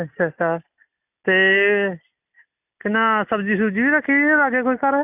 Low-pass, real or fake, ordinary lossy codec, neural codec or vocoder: 3.6 kHz; real; none; none